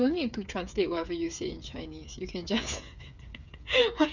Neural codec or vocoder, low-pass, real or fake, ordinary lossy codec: codec, 16 kHz, 8 kbps, FreqCodec, smaller model; 7.2 kHz; fake; none